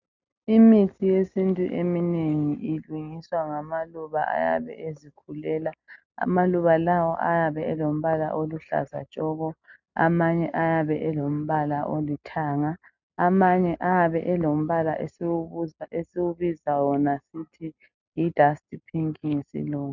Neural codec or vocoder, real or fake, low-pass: none; real; 7.2 kHz